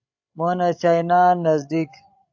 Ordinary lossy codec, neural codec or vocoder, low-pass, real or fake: AAC, 48 kbps; codec, 16 kHz, 16 kbps, FreqCodec, larger model; 7.2 kHz; fake